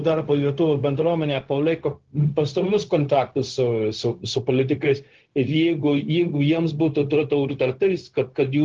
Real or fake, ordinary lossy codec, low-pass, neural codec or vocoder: fake; Opus, 16 kbps; 7.2 kHz; codec, 16 kHz, 0.4 kbps, LongCat-Audio-Codec